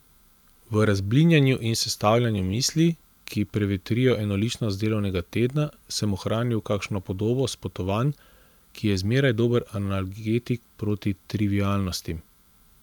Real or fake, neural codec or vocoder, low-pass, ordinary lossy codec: fake; vocoder, 44.1 kHz, 128 mel bands every 512 samples, BigVGAN v2; 19.8 kHz; none